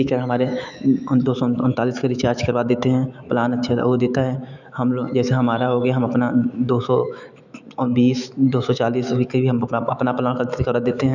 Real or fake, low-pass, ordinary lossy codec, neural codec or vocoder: fake; 7.2 kHz; none; autoencoder, 48 kHz, 128 numbers a frame, DAC-VAE, trained on Japanese speech